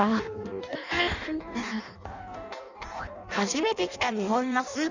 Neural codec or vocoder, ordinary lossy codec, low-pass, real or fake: codec, 16 kHz in and 24 kHz out, 0.6 kbps, FireRedTTS-2 codec; none; 7.2 kHz; fake